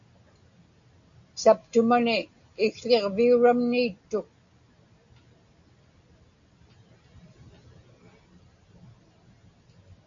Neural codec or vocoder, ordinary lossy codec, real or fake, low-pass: none; MP3, 64 kbps; real; 7.2 kHz